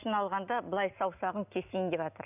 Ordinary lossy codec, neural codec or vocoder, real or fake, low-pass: none; none; real; 3.6 kHz